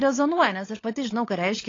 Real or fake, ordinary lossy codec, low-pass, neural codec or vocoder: fake; AAC, 32 kbps; 7.2 kHz; codec, 16 kHz, 16 kbps, FunCodec, trained on LibriTTS, 50 frames a second